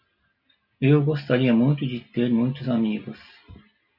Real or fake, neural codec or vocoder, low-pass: real; none; 5.4 kHz